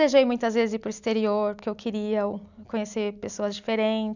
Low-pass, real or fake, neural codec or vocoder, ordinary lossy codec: 7.2 kHz; real; none; none